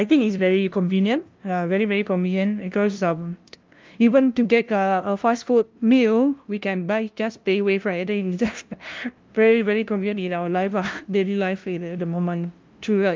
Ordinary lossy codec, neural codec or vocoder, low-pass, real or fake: Opus, 24 kbps; codec, 16 kHz, 0.5 kbps, FunCodec, trained on LibriTTS, 25 frames a second; 7.2 kHz; fake